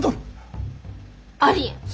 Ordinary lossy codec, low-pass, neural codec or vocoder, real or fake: none; none; none; real